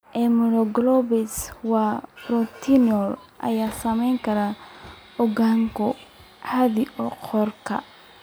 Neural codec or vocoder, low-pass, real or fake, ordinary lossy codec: none; none; real; none